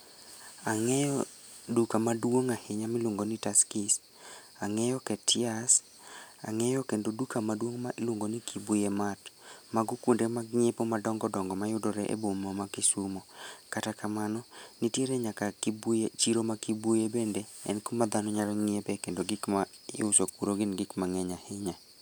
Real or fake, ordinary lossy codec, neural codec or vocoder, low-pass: real; none; none; none